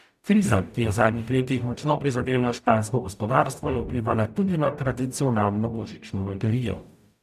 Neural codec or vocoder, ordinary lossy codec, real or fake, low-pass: codec, 44.1 kHz, 0.9 kbps, DAC; none; fake; 14.4 kHz